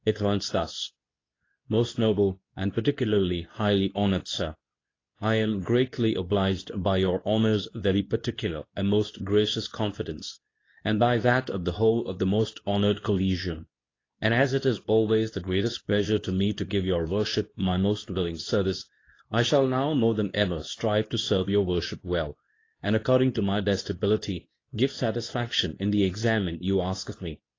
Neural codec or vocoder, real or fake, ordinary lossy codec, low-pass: codec, 24 kHz, 0.9 kbps, WavTokenizer, medium speech release version 2; fake; AAC, 32 kbps; 7.2 kHz